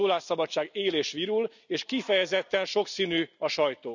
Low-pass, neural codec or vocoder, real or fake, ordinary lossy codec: 7.2 kHz; none; real; none